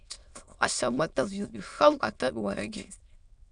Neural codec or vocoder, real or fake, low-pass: autoencoder, 22.05 kHz, a latent of 192 numbers a frame, VITS, trained on many speakers; fake; 9.9 kHz